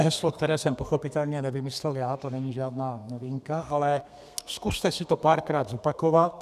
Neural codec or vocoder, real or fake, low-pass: codec, 32 kHz, 1.9 kbps, SNAC; fake; 14.4 kHz